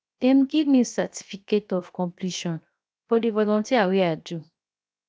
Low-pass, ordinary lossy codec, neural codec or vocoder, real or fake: none; none; codec, 16 kHz, 0.7 kbps, FocalCodec; fake